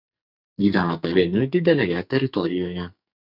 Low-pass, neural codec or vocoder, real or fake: 5.4 kHz; codec, 44.1 kHz, 2.6 kbps, SNAC; fake